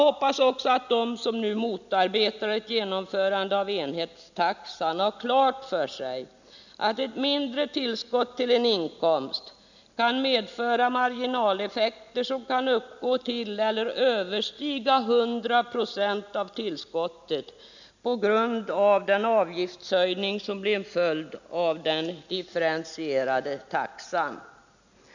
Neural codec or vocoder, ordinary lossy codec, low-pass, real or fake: none; none; 7.2 kHz; real